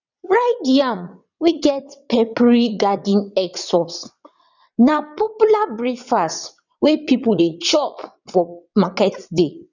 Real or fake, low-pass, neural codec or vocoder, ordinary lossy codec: fake; 7.2 kHz; vocoder, 22.05 kHz, 80 mel bands, WaveNeXt; none